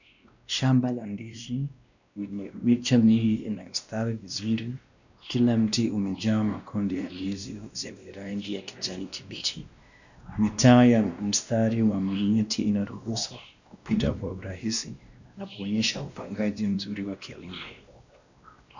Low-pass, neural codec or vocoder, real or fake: 7.2 kHz; codec, 16 kHz, 1 kbps, X-Codec, WavLM features, trained on Multilingual LibriSpeech; fake